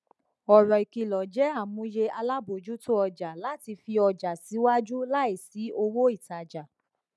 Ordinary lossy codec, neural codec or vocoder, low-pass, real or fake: none; none; none; real